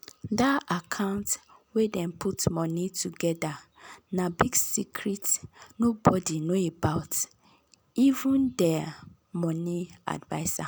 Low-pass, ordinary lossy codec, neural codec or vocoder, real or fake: none; none; none; real